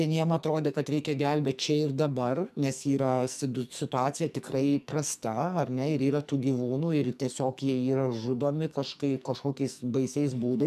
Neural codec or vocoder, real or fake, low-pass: codec, 44.1 kHz, 2.6 kbps, SNAC; fake; 14.4 kHz